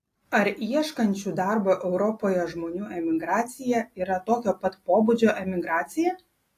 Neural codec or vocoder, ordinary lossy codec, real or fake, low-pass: none; AAC, 48 kbps; real; 14.4 kHz